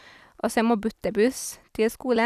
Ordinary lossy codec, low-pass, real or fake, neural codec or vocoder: none; 14.4 kHz; real; none